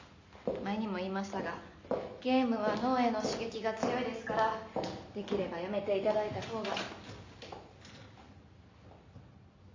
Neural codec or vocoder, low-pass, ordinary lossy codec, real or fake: none; 7.2 kHz; MP3, 48 kbps; real